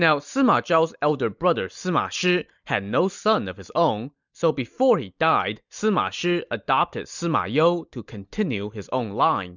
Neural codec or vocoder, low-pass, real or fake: none; 7.2 kHz; real